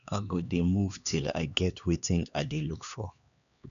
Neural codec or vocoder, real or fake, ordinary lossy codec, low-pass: codec, 16 kHz, 2 kbps, X-Codec, HuBERT features, trained on LibriSpeech; fake; none; 7.2 kHz